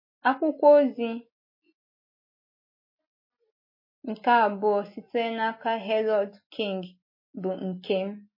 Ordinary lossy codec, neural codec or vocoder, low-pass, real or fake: MP3, 24 kbps; none; 5.4 kHz; real